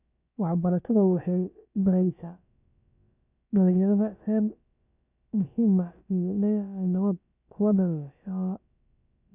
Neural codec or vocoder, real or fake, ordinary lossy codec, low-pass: codec, 16 kHz, about 1 kbps, DyCAST, with the encoder's durations; fake; none; 3.6 kHz